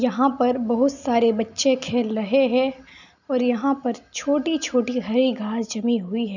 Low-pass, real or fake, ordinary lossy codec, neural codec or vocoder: 7.2 kHz; real; none; none